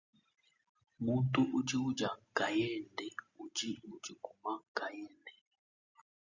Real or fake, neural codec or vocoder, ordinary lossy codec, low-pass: real; none; Opus, 64 kbps; 7.2 kHz